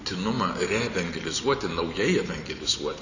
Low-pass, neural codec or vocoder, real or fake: 7.2 kHz; vocoder, 44.1 kHz, 128 mel bands every 512 samples, BigVGAN v2; fake